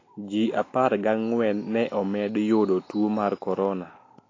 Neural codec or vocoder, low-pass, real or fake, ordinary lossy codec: none; 7.2 kHz; real; AAC, 32 kbps